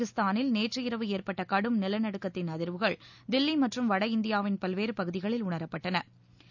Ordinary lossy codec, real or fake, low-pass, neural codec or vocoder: none; real; 7.2 kHz; none